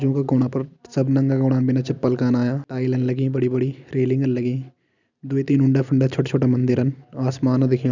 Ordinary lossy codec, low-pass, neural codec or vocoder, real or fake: none; 7.2 kHz; none; real